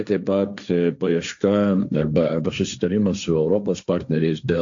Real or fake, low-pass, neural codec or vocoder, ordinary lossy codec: fake; 7.2 kHz; codec, 16 kHz, 1.1 kbps, Voila-Tokenizer; AAC, 64 kbps